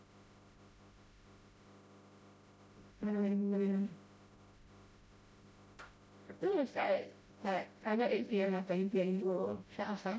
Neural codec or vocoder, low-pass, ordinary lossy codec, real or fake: codec, 16 kHz, 0.5 kbps, FreqCodec, smaller model; none; none; fake